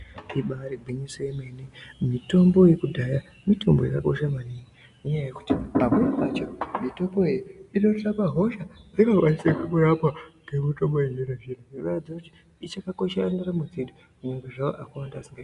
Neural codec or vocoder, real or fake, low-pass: none; real; 10.8 kHz